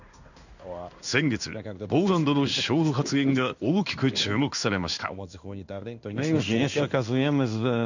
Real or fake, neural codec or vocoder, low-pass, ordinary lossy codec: fake; codec, 16 kHz in and 24 kHz out, 1 kbps, XY-Tokenizer; 7.2 kHz; none